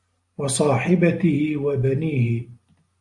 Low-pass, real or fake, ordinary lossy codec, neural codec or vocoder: 10.8 kHz; real; AAC, 64 kbps; none